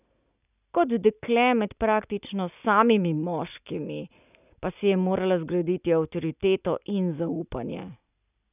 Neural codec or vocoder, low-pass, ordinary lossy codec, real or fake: none; 3.6 kHz; none; real